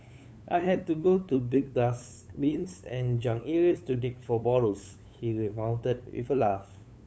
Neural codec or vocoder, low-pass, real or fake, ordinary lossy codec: codec, 16 kHz, 8 kbps, FunCodec, trained on LibriTTS, 25 frames a second; none; fake; none